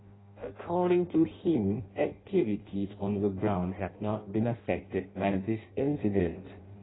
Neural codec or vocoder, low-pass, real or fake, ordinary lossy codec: codec, 16 kHz in and 24 kHz out, 0.6 kbps, FireRedTTS-2 codec; 7.2 kHz; fake; AAC, 16 kbps